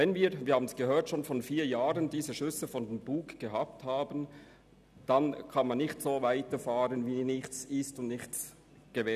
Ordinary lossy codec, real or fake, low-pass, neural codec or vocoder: none; real; 14.4 kHz; none